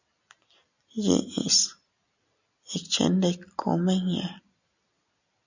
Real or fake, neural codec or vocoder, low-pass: real; none; 7.2 kHz